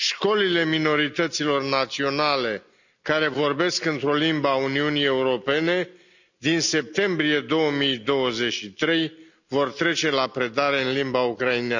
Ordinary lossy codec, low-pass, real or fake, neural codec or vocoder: none; 7.2 kHz; real; none